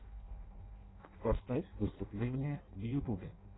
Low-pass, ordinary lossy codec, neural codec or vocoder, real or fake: 7.2 kHz; AAC, 16 kbps; codec, 16 kHz in and 24 kHz out, 0.6 kbps, FireRedTTS-2 codec; fake